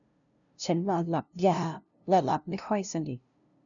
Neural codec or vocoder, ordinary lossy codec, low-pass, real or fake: codec, 16 kHz, 0.5 kbps, FunCodec, trained on LibriTTS, 25 frames a second; none; 7.2 kHz; fake